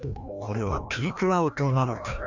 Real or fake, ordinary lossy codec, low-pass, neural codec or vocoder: fake; none; 7.2 kHz; codec, 16 kHz, 1 kbps, FreqCodec, larger model